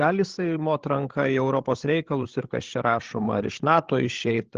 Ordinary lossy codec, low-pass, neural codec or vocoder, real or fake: Opus, 16 kbps; 7.2 kHz; codec, 16 kHz, 16 kbps, FreqCodec, larger model; fake